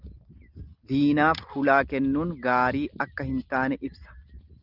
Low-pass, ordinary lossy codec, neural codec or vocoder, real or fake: 5.4 kHz; Opus, 24 kbps; none; real